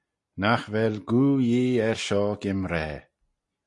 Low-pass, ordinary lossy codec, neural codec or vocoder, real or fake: 10.8 kHz; MP3, 48 kbps; none; real